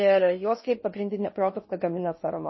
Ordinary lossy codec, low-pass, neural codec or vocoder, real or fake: MP3, 24 kbps; 7.2 kHz; codec, 16 kHz in and 24 kHz out, 0.6 kbps, FocalCodec, streaming, 4096 codes; fake